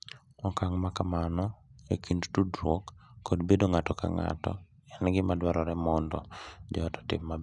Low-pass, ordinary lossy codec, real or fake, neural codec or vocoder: 10.8 kHz; none; real; none